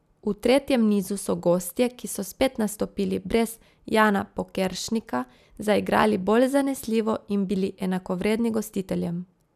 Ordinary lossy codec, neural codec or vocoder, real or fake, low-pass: none; none; real; 14.4 kHz